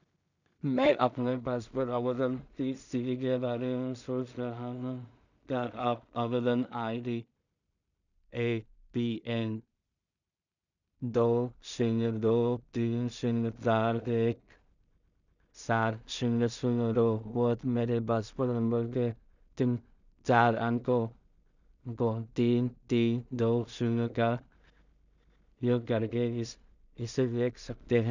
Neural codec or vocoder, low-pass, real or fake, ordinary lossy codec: codec, 16 kHz in and 24 kHz out, 0.4 kbps, LongCat-Audio-Codec, two codebook decoder; 7.2 kHz; fake; none